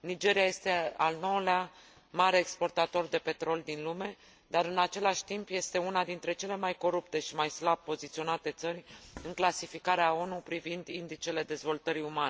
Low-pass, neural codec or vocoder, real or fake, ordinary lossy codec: none; none; real; none